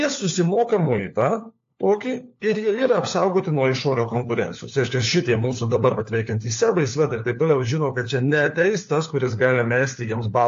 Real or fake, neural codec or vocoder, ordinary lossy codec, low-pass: fake; codec, 16 kHz, 4 kbps, FunCodec, trained on LibriTTS, 50 frames a second; AAC, 48 kbps; 7.2 kHz